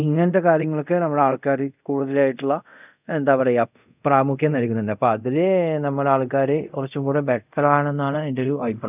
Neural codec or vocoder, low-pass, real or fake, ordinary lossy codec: codec, 24 kHz, 0.5 kbps, DualCodec; 3.6 kHz; fake; none